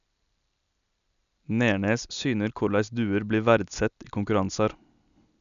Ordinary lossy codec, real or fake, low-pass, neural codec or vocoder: none; real; 7.2 kHz; none